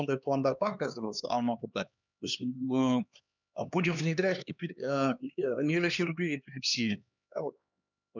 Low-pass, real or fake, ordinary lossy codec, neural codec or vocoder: 7.2 kHz; fake; none; codec, 16 kHz, 2 kbps, X-Codec, HuBERT features, trained on LibriSpeech